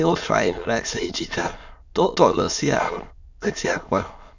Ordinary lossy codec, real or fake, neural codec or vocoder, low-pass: none; fake; autoencoder, 22.05 kHz, a latent of 192 numbers a frame, VITS, trained on many speakers; 7.2 kHz